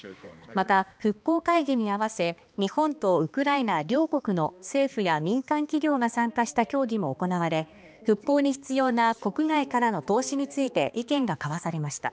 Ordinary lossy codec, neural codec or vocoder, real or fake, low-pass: none; codec, 16 kHz, 2 kbps, X-Codec, HuBERT features, trained on balanced general audio; fake; none